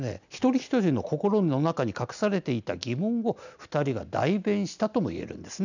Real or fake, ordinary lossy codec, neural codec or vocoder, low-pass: real; none; none; 7.2 kHz